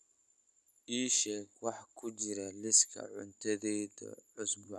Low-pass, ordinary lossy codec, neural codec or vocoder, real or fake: none; none; none; real